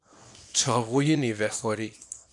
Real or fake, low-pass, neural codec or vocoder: fake; 10.8 kHz; codec, 24 kHz, 0.9 kbps, WavTokenizer, small release